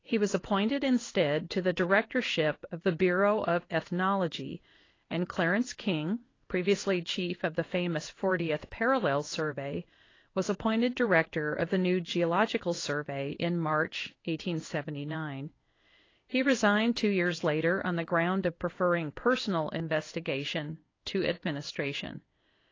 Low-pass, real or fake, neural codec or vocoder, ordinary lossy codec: 7.2 kHz; fake; codec, 16 kHz in and 24 kHz out, 1 kbps, XY-Tokenizer; AAC, 32 kbps